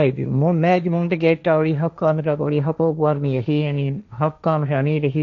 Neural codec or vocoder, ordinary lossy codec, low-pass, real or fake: codec, 16 kHz, 1.1 kbps, Voila-Tokenizer; none; 7.2 kHz; fake